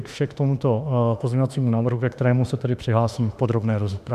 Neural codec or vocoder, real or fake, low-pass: autoencoder, 48 kHz, 32 numbers a frame, DAC-VAE, trained on Japanese speech; fake; 14.4 kHz